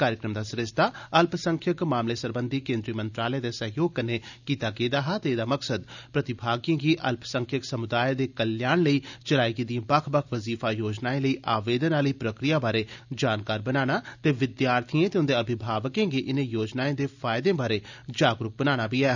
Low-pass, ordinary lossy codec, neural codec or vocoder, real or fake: 7.2 kHz; none; none; real